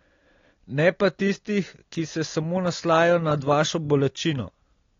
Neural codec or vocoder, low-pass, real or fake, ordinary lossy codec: none; 7.2 kHz; real; AAC, 32 kbps